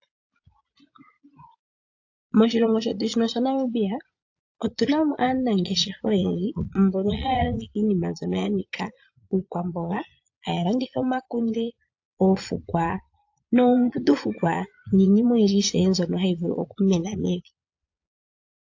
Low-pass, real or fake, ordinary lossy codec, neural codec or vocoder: 7.2 kHz; fake; AAC, 48 kbps; vocoder, 22.05 kHz, 80 mel bands, Vocos